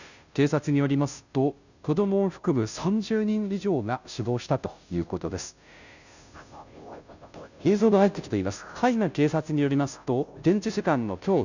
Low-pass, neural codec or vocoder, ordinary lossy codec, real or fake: 7.2 kHz; codec, 16 kHz, 0.5 kbps, FunCodec, trained on Chinese and English, 25 frames a second; none; fake